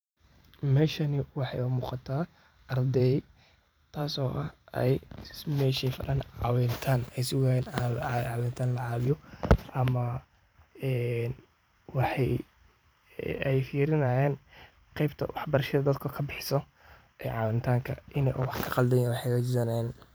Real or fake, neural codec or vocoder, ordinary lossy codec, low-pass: fake; vocoder, 44.1 kHz, 128 mel bands every 512 samples, BigVGAN v2; none; none